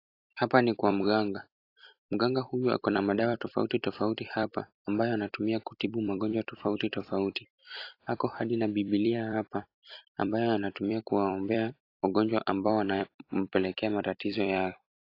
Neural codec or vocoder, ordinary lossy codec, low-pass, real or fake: vocoder, 44.1 kHz, 128 mel bands every 256 samples, BigVGAN v2; AAC, 32 kbps; 5.4 kHz; fake